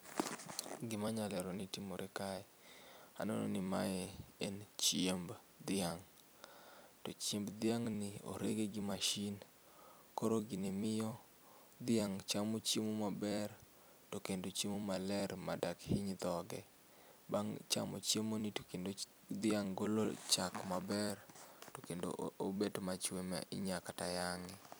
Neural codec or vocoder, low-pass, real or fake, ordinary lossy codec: vocoder, 44.1 kHz, 128 mel bands every 256 samples, BigVGAN v2; none; fake; none